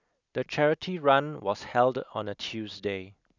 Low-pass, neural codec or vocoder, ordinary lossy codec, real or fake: 7.2 kHz; none; none; real